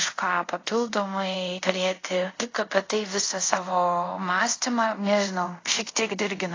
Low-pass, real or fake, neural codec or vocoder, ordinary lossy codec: 7.2 kHz; fake; codec, 24 kHz, 0.5 kbps, DualCodec; AAC, 32 kbps